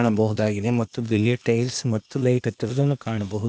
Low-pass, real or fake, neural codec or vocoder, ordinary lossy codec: none; fake; codec, 16 kHz, 0.8 kbps, ZipCodec; none